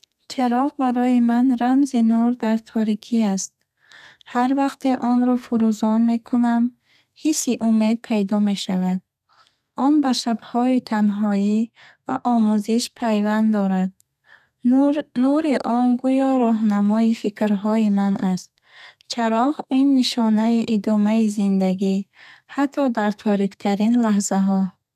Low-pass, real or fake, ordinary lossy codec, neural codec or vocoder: 14.4 kHz; fake; none; codec, 32 kHz, 1.9 kbps, SNAC